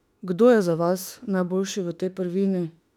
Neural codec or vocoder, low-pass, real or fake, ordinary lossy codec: autoencoder, 48 kHz, 32 numbers a frame, DAC-VAE, trained on Japanese speech; 19.8 kHz; fake; none